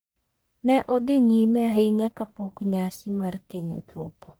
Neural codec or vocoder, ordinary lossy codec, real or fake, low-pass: codec, 44.1 kHz, 1.7 kbps, Pupu-Codec; none; fake; none